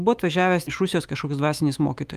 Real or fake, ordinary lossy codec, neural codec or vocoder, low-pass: fake; Opus, 32 kbps; autoencoder, 48 kHz, 128 numbers a frame, DAC-VAE, trained on Japanese speech; 14.4 kHz